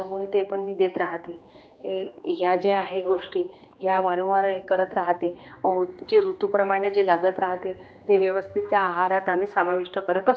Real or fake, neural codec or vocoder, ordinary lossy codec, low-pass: fake; codec, 16 kHz, 2 kbps, X-Codec, HuBERT features, trained on general audio; none; none